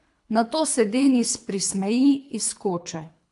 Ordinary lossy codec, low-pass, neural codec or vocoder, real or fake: AAC, 64 kbps; 10.8 kHz; codec, 24 kHz, 3 kbps, HILCodec; fake